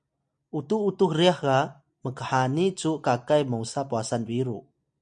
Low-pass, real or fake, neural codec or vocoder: 9.9 kHz; real; none